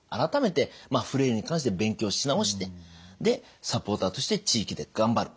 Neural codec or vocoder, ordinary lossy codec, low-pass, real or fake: none; none; none; real